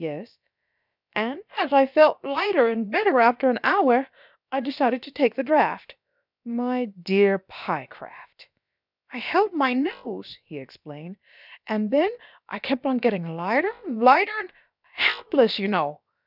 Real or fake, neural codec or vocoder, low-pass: fake; codec, 16 kHz, about 1 kbps, DyCAST, with the encoder's durations; 5.4 kHz